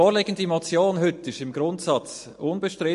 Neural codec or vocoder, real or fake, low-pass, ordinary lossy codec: none; real; 14.4 kHz; MP3, 48 kbps